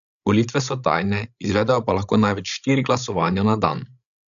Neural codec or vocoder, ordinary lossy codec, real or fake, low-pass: codec, 16 kHz, 16 kbps, FreqCodec, larger model; none; fake; 7.2 kHz